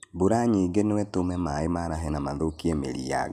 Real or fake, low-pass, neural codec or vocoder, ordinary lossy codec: real; 14.4 kHz; none; none